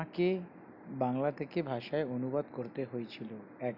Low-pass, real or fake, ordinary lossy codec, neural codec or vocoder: 5.4 kHz; real; none; none